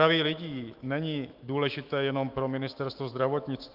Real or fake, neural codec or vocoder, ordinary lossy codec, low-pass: fake; autoencoder, 48 kHz, 128 numbers a frame, DAC-VAE, trained on Japanese speech; Opus, 32 kbps; 5.4 kHz